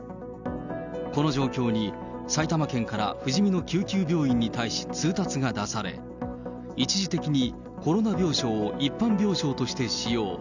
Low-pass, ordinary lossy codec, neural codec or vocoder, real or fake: 7.2 kHz; none; none; real